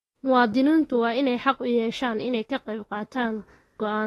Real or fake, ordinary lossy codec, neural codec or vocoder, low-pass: fake; AAC, 32 kbps; autoencoder, 48 kHz, 32 numbers a frame, DAC-VAE, trained on Japanese speech; 19.8 kHz